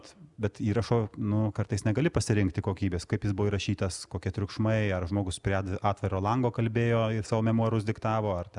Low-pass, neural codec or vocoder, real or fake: 10.8 kHz; none; real